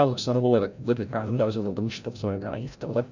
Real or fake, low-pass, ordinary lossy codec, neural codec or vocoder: fake; 7.2 kHz; none; codec, 16 kHz, 0.5 kbps, FreqCodec, larger model